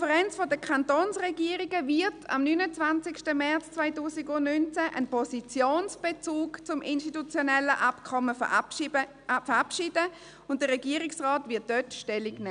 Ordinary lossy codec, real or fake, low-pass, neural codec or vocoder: none; real; 9.9 kHz; none